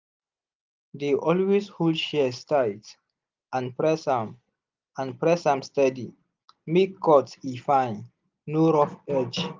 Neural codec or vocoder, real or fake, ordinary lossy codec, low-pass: none; real; Opus, 24 kbps; 7.2 kHz